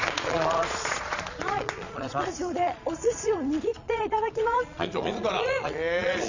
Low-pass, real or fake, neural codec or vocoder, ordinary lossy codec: 7.2 kHz; fake; vocoder, 22.05 kHz, 80 mel bands, Vocos; Opus, 64 kbps